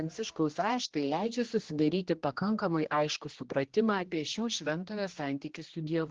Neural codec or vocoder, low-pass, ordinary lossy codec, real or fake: codec, 16 kHz, 1 kbps, X-Codec, HuBERT features, trained on general audio; 7.2 kHz; Opus, 16 kbps; fake